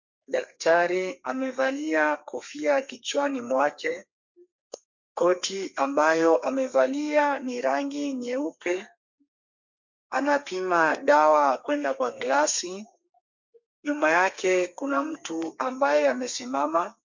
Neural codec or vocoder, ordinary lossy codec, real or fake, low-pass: codec, 32 kHz, 1.9 kbps, SNAC; MP3, 48 kbps; fake; 7.2 kHz